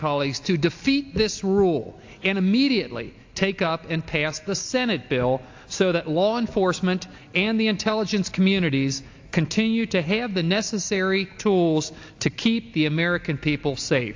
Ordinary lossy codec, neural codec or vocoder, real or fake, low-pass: AAC, 48 kbps; none; real; 7.2 kHz